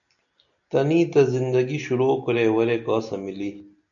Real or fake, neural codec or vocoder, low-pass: real; none; 7.2 kHz